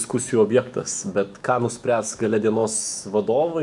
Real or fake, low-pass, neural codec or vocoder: fake; 10.8 kHz; autoencoder, 48 kHz, 128 numbers a frame, DAC-VAE, trained on Japanese speech